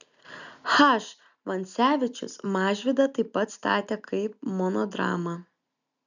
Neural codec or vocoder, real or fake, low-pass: none; real; 7.2 kHz